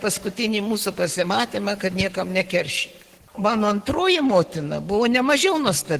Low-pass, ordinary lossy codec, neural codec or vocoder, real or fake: 14.4 kHz; Opus, 16 kbps; codec, 44.1 kHz, 7.8 kbps, Pupu-Codec; fake